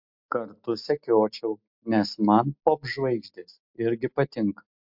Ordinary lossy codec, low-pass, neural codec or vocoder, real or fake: MP3, 48 kbps; 5.4 kHz; none; real